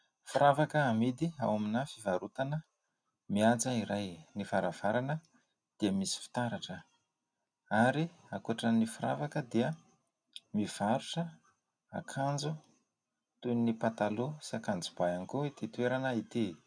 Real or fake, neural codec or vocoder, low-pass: real; none; 9.9 kHz